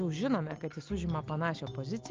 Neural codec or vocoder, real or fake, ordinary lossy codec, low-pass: none; real; Opus, 24 kbps; 7.2 kHz